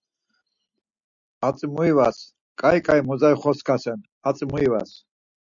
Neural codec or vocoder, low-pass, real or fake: none; 7.2 kHz; real